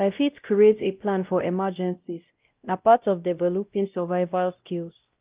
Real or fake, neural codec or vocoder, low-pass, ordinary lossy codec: fake; codec, 16 kHz, 0.5 kbps, X-Codec, WavLM features, trained on Multilingual LibriSpeech; 3.6 kHz; Opus, 24 kbps